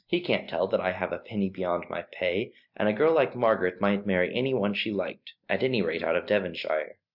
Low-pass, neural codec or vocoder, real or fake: 5.4 kHz; none; real